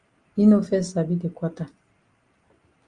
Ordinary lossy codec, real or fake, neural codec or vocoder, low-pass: Opus, 32 kbps; real; none; 9.9 kHz